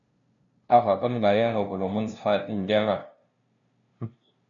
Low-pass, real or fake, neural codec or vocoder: 7.2 kHz; fake; codec, 16 kHz, 0.5 kbps, FunCodec, trained on LibriTTS, 25 frames a second